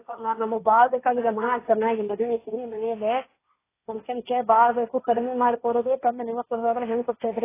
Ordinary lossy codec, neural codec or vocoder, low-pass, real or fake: AAC, 16 kbps; codec, 16 kHz, 1.1 kbps, Voila-Tokenizer; 3.6 kHz; fake